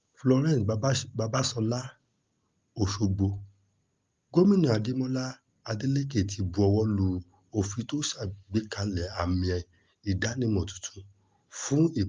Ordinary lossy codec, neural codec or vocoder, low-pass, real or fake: Opus, 32 kbps; none; 7.2 kHz; real